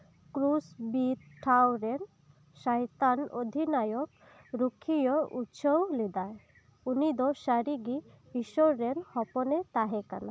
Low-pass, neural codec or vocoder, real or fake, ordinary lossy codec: none; none; real; none